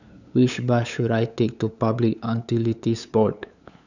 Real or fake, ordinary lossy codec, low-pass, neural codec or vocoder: fake; none; 7.2 kHz; codec, 16 kHz, 8 kbps, FunCodec, trained on LibriTTS, 25 frames a second